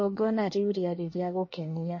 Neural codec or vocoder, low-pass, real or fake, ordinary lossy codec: codec, 24 kHz, 1 kbps, SNAC; 7.2 kHz; fake; MP3, 32 kbps